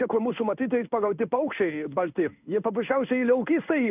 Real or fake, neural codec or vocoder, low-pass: fake; codec, 16 kHz in and 24 kHz out, 1 kbps, XY-Tokenizer; 3.6 kHz